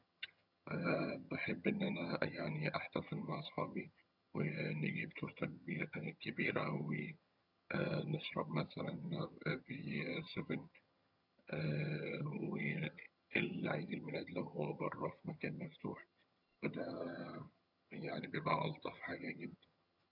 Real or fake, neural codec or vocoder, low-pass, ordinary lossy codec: fake; vocoder, 22.05 kHz, 80 mel bands, HiFi-GAN; 5.4 kHz; none